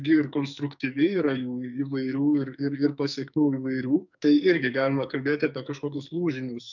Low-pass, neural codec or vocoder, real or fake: 7.2 kHz; codec, 44.1 kHz, 2.6 kbps, SNAC; fake